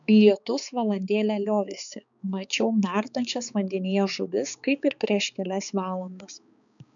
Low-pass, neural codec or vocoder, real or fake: 7.2 kHz; codec, 16 kHz, 4 kbps, X-Codec, HuBERT features, trained on balanced general audio; fake